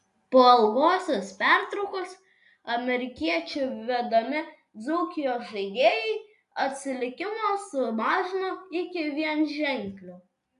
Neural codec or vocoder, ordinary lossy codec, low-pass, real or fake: none; AAC, 64 kbps; 10.8 kHz; real